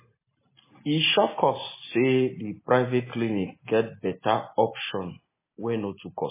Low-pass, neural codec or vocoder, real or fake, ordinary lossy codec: 3.6 kHz; none; real; MP3, 16 kbps